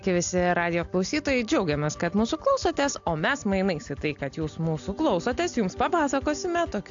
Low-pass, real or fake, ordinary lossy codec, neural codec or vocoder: 7.2 kHz; real; AAC, 64 kbps; none